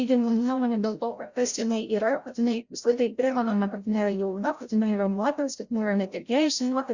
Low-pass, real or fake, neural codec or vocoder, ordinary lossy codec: 7.2 kHz; fake; codec, 16 kHz, 0.5 kbps, FreqCodec, larger model; Opus, 64 kbps